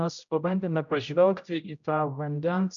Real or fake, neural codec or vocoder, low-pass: fake; codec, 16 kHz, 0.5 kbps, X-Codec, HuBERT features, trained on general audio; 7.2 kHz